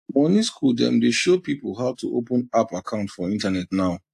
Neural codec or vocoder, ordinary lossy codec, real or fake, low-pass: none; AAC, 64 kbps; real; 14.4 kHz